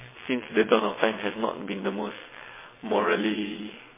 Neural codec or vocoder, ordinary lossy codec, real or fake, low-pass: vocoder, 22.05 kHz, 80 mel bands, WaveNeXt; MP3, 16 kbps; fake; 3.6 kHz